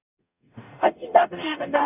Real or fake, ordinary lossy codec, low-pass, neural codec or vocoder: fake; none; 3.6 kHz; codec, 44.1 kHz, 0.9 kbps, DAC